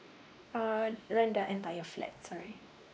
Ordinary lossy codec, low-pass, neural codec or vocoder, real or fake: none; none; codec, 16 kHz, 2 kbps, X-Codec, WavLM features, trained on Multilingual LibriSpeech; fake